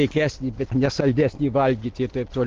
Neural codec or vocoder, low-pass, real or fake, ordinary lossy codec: none; 7.2 kHz; real; Opus, 16 kbps